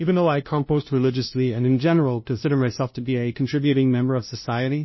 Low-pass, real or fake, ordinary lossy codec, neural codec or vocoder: 7.2 kHz; fake; MP3, 24 kbps; codec, 16 kHz, 0.5 kbps, FunCodec, trained on LibriTTS, 25 frames a second